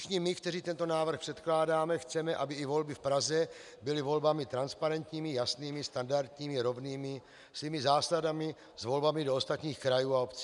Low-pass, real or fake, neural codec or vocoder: 10.8 kHz; real; none